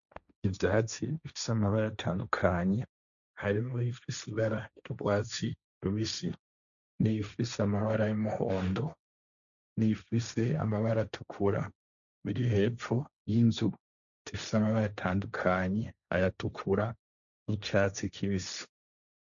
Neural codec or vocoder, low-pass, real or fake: codec, 16 kHz, 1.1 kbps, Voila-Tokenizer; 7.2 kHz; fake